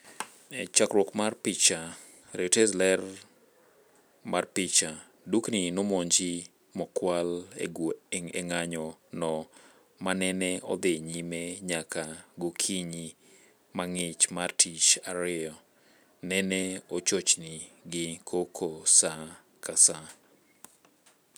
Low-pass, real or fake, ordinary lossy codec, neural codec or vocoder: none; real; none; none